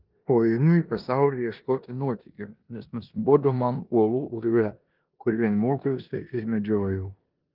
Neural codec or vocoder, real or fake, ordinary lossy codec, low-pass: codec, 16 kHz in and 24 kHz out, 0.9 kbps, LongCat-Audio-Codec, four codebook decoder; fake; Opus, 32 kbps; 5.4 kHz